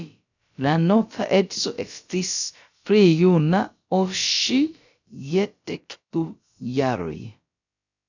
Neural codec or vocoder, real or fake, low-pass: codec, 16 kHz, about 1 kbps, DyCAST, with the encoder's durations; fake; 7.2 kHz